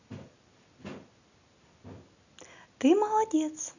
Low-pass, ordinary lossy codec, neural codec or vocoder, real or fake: 7.2 kHz; none; none; real